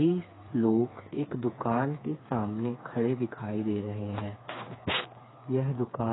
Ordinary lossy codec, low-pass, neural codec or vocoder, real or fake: AAC, 16 kbps; 7.2 kHz; codec, 16 kHz, 4 kbps, FreqCodec, smaller model; fake